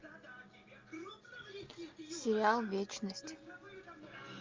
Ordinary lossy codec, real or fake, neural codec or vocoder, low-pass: Opus, 24 kbps; real; none; 7.2 kHz